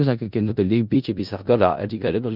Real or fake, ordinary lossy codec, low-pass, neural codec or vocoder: fake; none; 5.4 kHz; codec, 16 kHz in and 24 kHz out, 0.4 kbps, LongCat-Audio-Codec, four codebook decoder